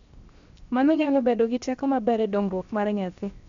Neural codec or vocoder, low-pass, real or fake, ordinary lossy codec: codec, 16 kHz, 0.7 kbps, FocalCodec; 7.2 kHz; fake; MP3, 64 kbps